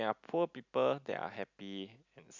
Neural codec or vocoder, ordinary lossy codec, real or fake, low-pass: none; none; real; 7.2 kHz